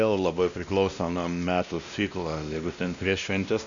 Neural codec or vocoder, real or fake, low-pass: codec, 16 kHz, 1 kbps, X-Codec, WavLM features, trained on Multilingual LibriSpeech; fake; 7.2 kHz